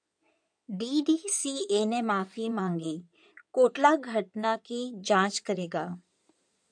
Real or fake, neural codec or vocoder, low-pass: fake; codec, 16 kHz in and 24 kHz out, 2.2 kbps, FireRedTTS-2 codec; 9.9 kHz